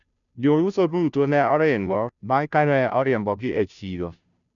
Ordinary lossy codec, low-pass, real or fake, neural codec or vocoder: none; 7.2 kHz; fake; codec, 16 kHz, 0.5 kbps, FunCodec, trained on Chinese and English, 25 frames a second